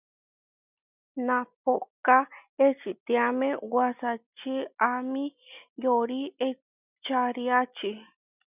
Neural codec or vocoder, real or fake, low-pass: none; real; 3.6 kHz